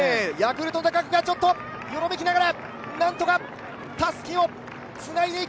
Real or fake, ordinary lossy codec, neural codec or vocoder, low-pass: real; none; none; none